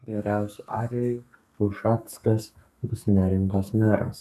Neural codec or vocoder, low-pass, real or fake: codec, 32 kHz, 1.9 kbps, SNAC; 14.4 kHz; fake